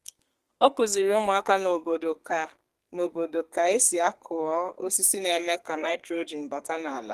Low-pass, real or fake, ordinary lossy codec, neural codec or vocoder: 14.4 kHz; fake; Opus, 24 kbps; codec, 32 kHz, 1.9 kbps, SNAC